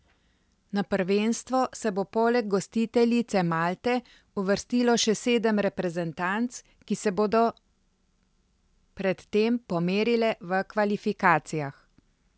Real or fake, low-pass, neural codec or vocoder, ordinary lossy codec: real; none; none; none